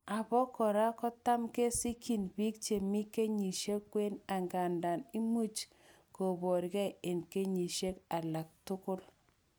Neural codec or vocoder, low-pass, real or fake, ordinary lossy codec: none; none; real; none